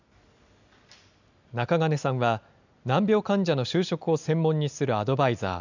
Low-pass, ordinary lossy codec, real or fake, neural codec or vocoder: 7.2 kHz; none; real; none